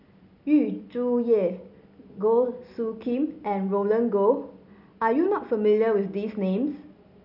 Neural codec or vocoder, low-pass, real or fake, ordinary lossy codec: none; 5.4 kHz; real; none